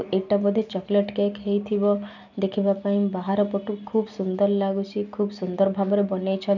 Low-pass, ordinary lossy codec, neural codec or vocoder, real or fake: 7.2 kHz; none; none; real